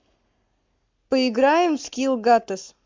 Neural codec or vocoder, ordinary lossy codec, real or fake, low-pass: codec, 44.1 kHz, 7.8 kbps, Pupu-Codec; MP3, 64 kbps; fake; 7.2 kHz